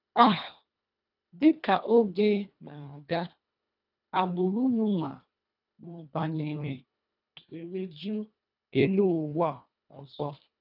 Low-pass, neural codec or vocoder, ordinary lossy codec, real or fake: 5.4 kHz; codec, 24 kHz, 1.5 kbps, HILCodec; none; fake